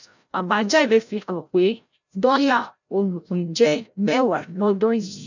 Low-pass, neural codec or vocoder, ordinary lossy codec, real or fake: 7.2 kHz; codec, 16 kHz, 0.5 kbps, FreqCodec, larger model; none; fake